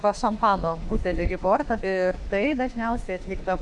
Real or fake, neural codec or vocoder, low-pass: fake; autoencoder, 48 kHz, 32 numbers a frame, DAC-VAE, trained on Japanese speech; 10.8 kHz